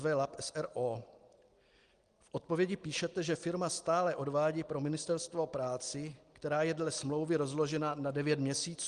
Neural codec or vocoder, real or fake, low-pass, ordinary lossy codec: none; real; 9.9 kHz; Opus, 24 kbps